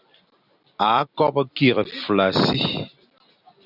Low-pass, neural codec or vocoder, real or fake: 5.4 kHz; vocoder, 44.1 kHz, 128 mel bands every 256 samples, BigVGAN v2; fake